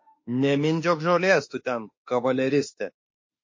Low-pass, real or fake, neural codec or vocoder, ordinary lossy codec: 7.2 kHz; fake; autoencoder, 48 kHz, 32 numbers a frame, DAC-VAE, trained on Japanese speech; MP3, 32 kbps